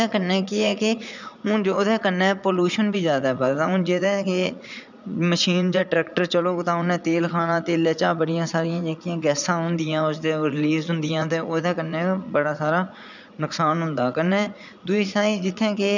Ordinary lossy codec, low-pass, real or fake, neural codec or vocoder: none; 7.2 kHz; fake; vocoder, 44.1 kHz, 80 mel bands, Vocos